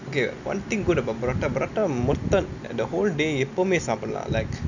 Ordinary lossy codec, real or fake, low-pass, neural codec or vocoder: none; real; 7.2 kHz; none